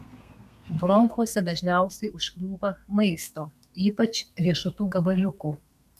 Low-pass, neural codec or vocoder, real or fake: 14.4 kHz; codec, 32 kHz, 1.9 kbps, SNAC; fake